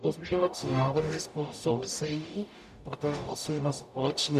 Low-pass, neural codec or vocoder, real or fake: 14.4 kHz; codec, 44.1 kHz, 0.9 kbps, DAC; fake